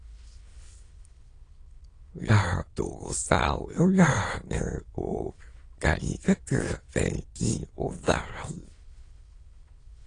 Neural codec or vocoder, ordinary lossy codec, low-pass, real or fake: autoencoder, 22.05 kHz, a latent of 192 numbers a frame, VITS, trained on many speakers; AAC, 32 kbps; 9.9 kHz; fake